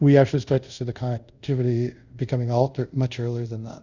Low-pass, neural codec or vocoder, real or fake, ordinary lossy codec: 7.2 kHz; codec, 24 kHz, 0.5 kbps, DualCodec; fake; Opus, 64 kbps